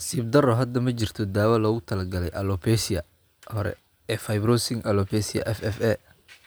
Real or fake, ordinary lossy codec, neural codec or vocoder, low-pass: real; none; none; none